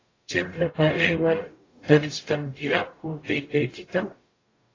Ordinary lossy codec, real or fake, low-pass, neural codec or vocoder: AAC, 32 kbps; fake; 7.2 kHz; codec, 44.1 kHz, 0.9 kbps, DAC